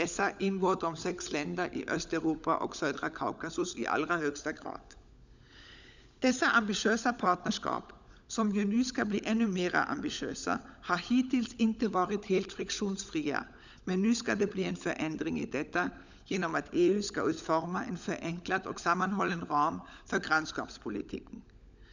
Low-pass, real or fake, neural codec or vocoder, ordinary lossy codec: 7.2 kHz; fake; codec, 16 kHz, 16 kbps, FunCodec, trained on LibriTTS, 50 frames a second; none